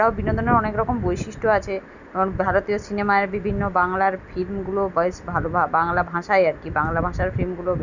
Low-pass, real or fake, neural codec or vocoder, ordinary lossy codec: 7.2 kHz; real; none; none